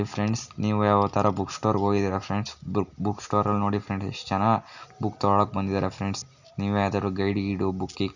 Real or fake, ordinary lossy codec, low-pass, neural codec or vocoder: real; none; 7.2 kHz; none